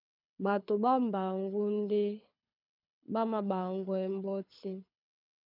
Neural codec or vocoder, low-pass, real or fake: codec, 24 kHz, 6 kbps, HILCodec; 5.4 kHz; fake